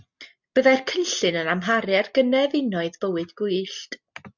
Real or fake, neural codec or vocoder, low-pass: real; none; 7.2 kHz